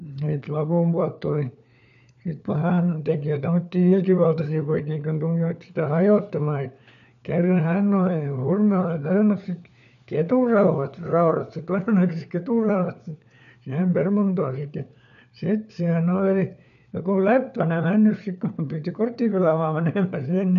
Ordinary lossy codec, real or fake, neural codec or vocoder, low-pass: none; fake; codec, 16 kHz, 4 kbps, FunCodec, trained on LibriTTS, 50 frames a second; 7.2 kHz